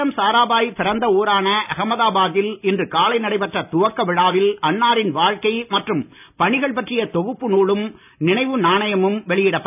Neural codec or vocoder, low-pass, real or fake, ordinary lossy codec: none; 3.6 kHz; real; none